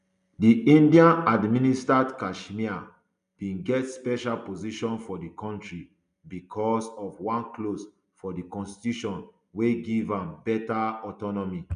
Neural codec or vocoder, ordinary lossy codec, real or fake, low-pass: none; none; real; 9.9 kHz